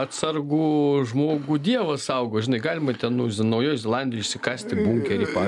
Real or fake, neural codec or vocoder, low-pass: real; none; 10.8 kHz